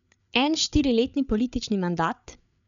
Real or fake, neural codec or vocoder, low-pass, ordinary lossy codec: fake; codec, 16 kHz, 8 kbps, FreqCodec, larger model; 7.2 kHz; none